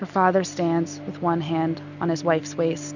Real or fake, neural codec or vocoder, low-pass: real; none; 7.2 kHz